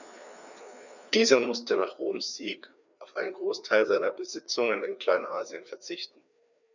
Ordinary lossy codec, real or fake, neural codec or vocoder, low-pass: none; fake; codec, 16 kHz, 2 kbps, FreqCodec, larger model; 7.2 kHz